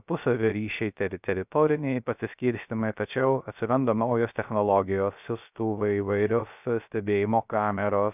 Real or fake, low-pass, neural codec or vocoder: fake; 3.6 kHz; codec, 16 kHz, 0.3 kbps, FocalCodec